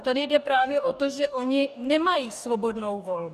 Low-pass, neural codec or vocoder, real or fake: 14.4 kHz; codec, 44.1 kHz, 2.6 kbps, DAC; fake